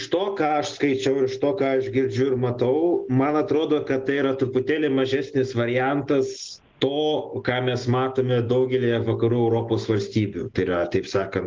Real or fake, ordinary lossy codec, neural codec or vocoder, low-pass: real; Opus, 16 kbps; none; 7.2 kHz